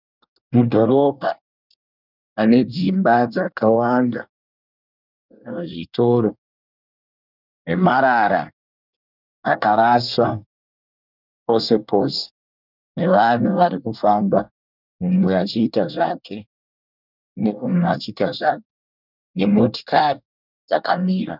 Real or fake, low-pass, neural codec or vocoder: fake; 5.4 kHz; codec, 24 kHz, 1 kbps, SNAC